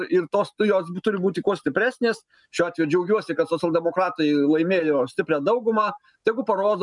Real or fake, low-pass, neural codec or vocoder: real; 10.8 kHz; none